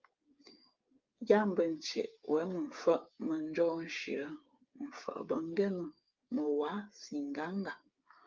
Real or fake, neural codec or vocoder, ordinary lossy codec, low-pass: fake; codec, 16 kHz, 8 kbps, FreqCodec, smaller model; Opus, 24 kbps; 7.2 kHz